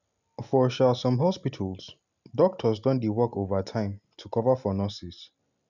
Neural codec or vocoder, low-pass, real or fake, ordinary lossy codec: none; 7.2 kHz; real; none